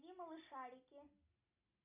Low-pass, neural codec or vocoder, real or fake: 3.6 kHz; none; real